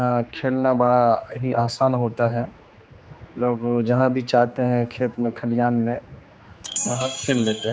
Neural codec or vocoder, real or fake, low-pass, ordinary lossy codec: codec, 16 kHz, 2 kbps, X-Codec, HuBERT features, trained on general audio; fake; none; none